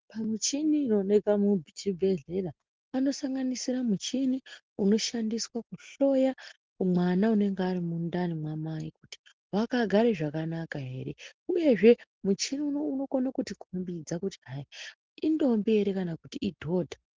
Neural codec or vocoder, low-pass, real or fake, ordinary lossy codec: none; 7.2 kHz; real; Opus, 16 kbps